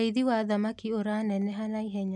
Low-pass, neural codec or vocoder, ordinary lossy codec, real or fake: 10.8 kHz; none; none; real